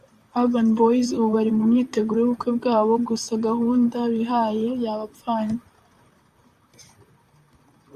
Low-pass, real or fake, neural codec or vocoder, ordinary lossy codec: 14.4 kHz; fake; vocoder, 44.1 kHz, 128 mel bands, Pupu-Vocoder; Opus, 64 kbps